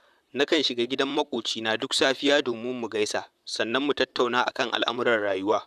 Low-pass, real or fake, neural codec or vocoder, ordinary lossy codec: 14.4 kHz; fake; vocoder, 44.1 kHz, 128 mel bands, Pupu-Vocoder; none